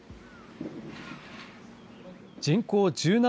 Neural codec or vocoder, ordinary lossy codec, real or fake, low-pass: none; none; real; none